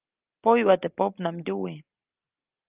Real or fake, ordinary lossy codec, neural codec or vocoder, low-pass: real; Opus, 32 kbps; none; 3.6 kHz